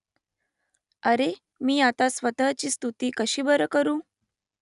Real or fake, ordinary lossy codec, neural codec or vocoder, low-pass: real; none; none; 10.8 kHz